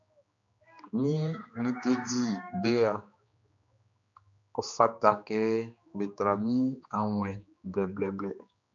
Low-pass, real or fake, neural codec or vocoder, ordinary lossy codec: 7.2 kHz; fake; codec, 16 kHz, 2 kbps, X-Codec, HuBERT features, trained on general audio; MP3, 64 kbps